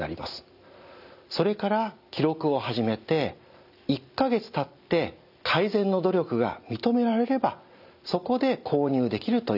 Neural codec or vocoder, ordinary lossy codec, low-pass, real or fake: none; none; 5.4 kHz; real